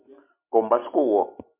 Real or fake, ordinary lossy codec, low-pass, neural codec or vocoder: real; MP3, 32 kbps; 3.6 kHz; none